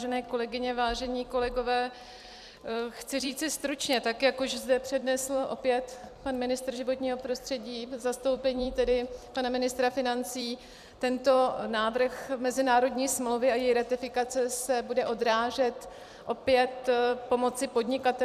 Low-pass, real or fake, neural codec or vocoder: 14.4 kHz; fake; vocoder, 44.1 kHz, 128 mel bands every 256 samples, BigVGAN v2